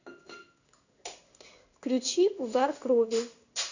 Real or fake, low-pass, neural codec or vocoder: fake; 7.2 kHz; codec, 16 kHz in and 24 kHz out, 1 kbps, XY-Tokenizer